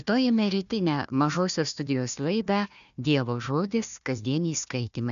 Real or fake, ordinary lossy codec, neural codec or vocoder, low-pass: fake; MP3, 96 kbps; codec, 16 kHz, 1 kbps, FunCodec, trained on Chinese and English, 50 frames a second; 7.2 kHz